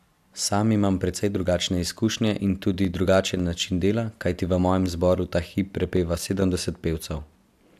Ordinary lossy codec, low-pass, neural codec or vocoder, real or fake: AAC, 96 kbps; 14.4 kHz; none; real